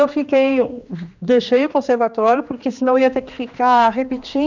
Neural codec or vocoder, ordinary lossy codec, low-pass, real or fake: codec, 16 kHz, 2 kbps, X-Codec, HuBERT features, trained on general audio; none; 7.2 kHz; fake